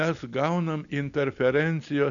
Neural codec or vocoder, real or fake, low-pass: none; real; 7.2 kHz